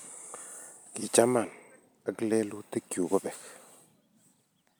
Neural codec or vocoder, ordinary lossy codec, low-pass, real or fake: none; none; none; real